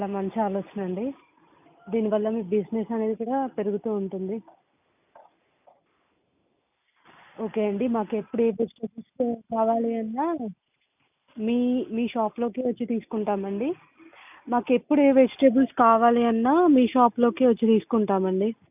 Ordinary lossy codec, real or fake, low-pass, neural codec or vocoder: none; real; 3.6 kHz; none